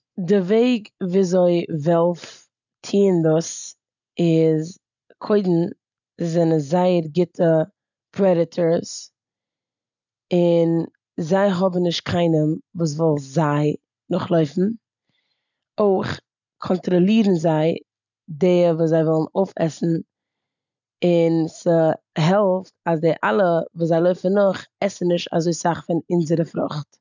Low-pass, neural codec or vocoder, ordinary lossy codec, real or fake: 7.2 kHz; none; none; real